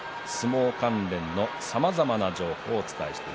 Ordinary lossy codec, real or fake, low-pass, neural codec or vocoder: none; real; none; none